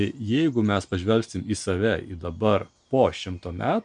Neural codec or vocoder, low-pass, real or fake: none; 9.9 kHz; real